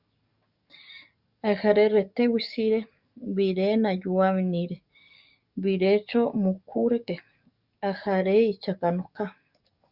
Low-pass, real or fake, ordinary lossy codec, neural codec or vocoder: 5.4 kHz; fake; Opus, 64 kbps; codec, 44.1 kHz, 7.8 kbps, DAC